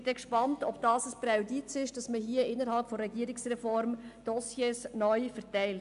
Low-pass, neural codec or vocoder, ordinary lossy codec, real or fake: 10.8 kHz; none; AAC, 96 kbps; real